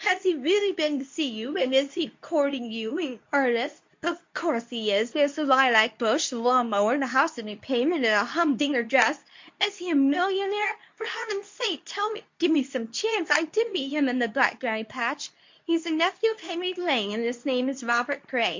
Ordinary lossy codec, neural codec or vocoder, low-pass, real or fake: MP3, 48 kbps; codec, 24 kHz, 0.9 kbps, WavTokenizer, medium speech release version 2; 7.2 kHz; fake